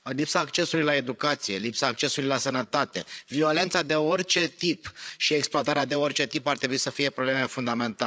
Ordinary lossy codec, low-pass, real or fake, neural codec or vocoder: none; none; fake; codec, 16 kHz, 8 kbps, FreqCodec, larger model